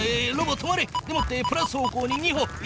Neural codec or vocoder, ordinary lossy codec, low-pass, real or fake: none; none; none; real